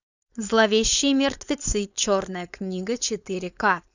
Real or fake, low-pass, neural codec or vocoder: fake; 7.2 kHz; codec, 16 kHz, 4.8 kbps, FACodec